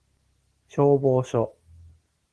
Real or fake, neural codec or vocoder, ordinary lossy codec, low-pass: real; none; Opus, 16 kbps; 10.8 kHz